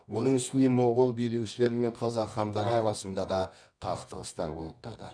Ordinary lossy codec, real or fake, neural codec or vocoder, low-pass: none; fake; codec, 24 kHz, 0.9 kbps, WavTokenizer, medium music audio release; 9.9 kHz